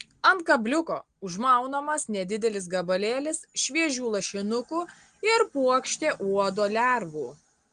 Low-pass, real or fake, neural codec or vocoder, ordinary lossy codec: 9.9 kHz; real; none; Opus, 24 kbps